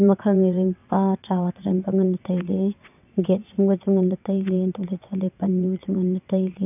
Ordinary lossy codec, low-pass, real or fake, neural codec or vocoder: none; 3.6 kHz; fake; vocoder, 22.05 kHz, 80 mel bands, WaveNeXt